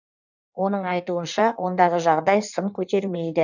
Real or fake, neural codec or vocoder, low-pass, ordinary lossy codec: fake; codec, 16 kHz in and 24 kHz out, 1.1 kbps, FireRedTTS-2 codec; 7.2 kHz; none